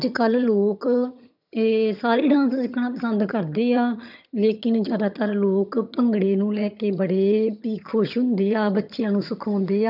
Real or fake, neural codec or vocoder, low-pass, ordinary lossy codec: fake; vocoder, 22.05 kHz, 80 mel bands, HiFi-GAN; 5.4 kHz; none